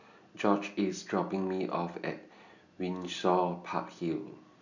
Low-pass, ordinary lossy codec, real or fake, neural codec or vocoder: 7.2 kHz; none; real; none